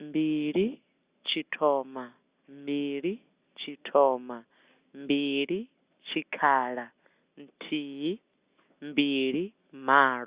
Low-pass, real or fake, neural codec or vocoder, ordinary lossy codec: 3.6 kHz; real; none; Opus, 64 kbps